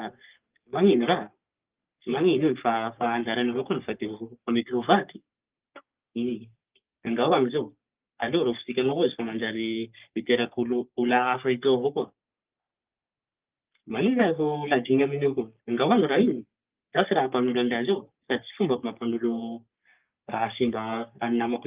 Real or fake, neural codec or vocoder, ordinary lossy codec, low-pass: fake; codec, 44.1 kHz, 3.4 kbps, Pupu-Codec; Opus, 32 kbps; 3.6 kHz